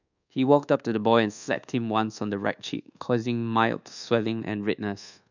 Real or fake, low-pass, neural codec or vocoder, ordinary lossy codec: fake; 7.2 kHz; codec, 24 kHz, 1.2 kbps, DualCodec; none